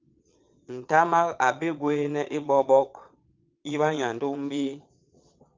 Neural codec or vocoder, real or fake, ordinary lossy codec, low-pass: vocoder, 22.05 kHz, 80 mel bands, Vocos; fake; Opus, 24 kbps; 7.2 kHz